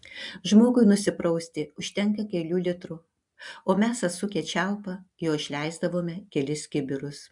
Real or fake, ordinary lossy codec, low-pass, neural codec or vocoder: real; MP3, 96 kbps; 10.8 kHz; none